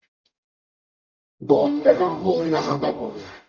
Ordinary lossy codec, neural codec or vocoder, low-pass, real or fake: Opus, 64 kbps; codec, 44.1 kHz, 0.9 kbps, DAC; 7.2 kHz; fake